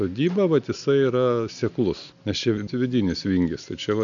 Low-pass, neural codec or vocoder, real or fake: 7.2 kHz; none; real